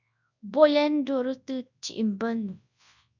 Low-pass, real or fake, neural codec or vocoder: 7.2 kHz; fake; codec, 24 kHz, 0.9 kbps, WavTokenizer, large speech release